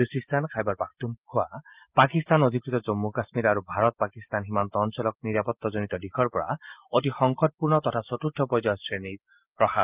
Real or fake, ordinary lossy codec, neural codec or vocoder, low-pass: real; Opus, 32 kbps; none; 3.6 kHz